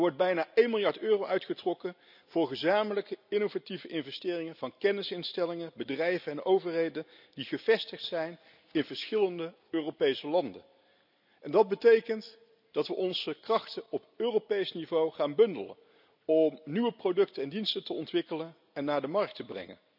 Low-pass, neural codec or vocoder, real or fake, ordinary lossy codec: 5.4 kHz; none; real; none